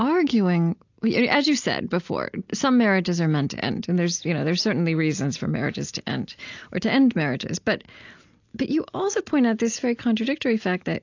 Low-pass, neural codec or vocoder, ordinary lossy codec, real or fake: 7.2 kHz; none; AAC, 48 kbps; real